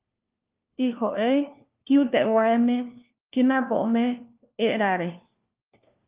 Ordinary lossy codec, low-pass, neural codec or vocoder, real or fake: Opus, 32 kbps; 3.6 kHz; codec, 16 kHz, 1 kbps, FunCodec, trained on LibriTTS, 50 frames a second; fake